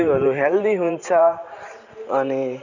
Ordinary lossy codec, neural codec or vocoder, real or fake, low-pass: none; vocoder, 44.1 kHz, 128 mel bands every 512 samples, BigVGAN v2; fake; 7.2 kHz